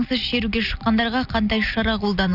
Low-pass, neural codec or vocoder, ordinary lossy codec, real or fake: 5.4 kHz; none; none; real